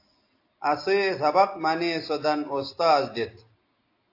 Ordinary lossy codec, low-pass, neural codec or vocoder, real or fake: AAC, 32 kbps; 5.4 kHz; none; real